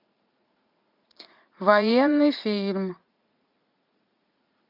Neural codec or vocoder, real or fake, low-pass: vocoder, 44.1 kHz, 80 mel bands, Vocos; fake; 5.4 kHz